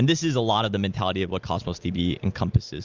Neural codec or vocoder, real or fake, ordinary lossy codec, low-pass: none; real; Opus, 32 kbps; 7.2 kHz